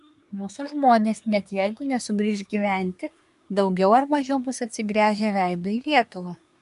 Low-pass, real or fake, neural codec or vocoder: 10.8 kHz; fake; codec, 24 kHz, 1 kbps, SNAC